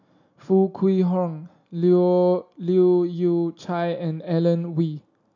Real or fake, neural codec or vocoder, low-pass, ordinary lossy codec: real; none; 7.2 kHz; none